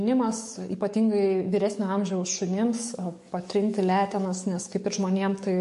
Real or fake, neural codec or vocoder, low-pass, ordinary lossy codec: fake; codec, 44.1 kHz, 7.8 kbps, DAC; 14.4 kHz; MP3, 48 kbps